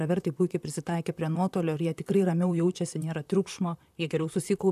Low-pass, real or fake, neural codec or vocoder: 14.4 kHz; fake; vocoder, 44.1 kHz, 128 mel bands, Pupu-Vocoder